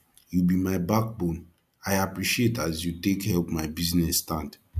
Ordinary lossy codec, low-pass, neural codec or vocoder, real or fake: none; 14.4 kHz; none; real